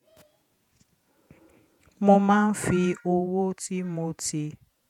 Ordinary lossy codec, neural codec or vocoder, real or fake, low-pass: none; vocoder, 48 kHz, 128 mel bands, Vocos; fake; 19.8 kHz